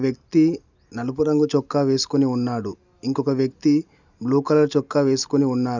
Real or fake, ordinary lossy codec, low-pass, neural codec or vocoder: real; none; 7.2 kHz; none